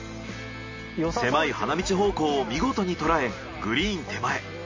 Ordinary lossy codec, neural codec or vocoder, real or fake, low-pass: MP3, 32 kbps; none; real; 7.2 kHz